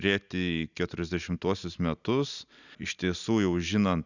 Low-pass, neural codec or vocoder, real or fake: 7.2 kHz; none; real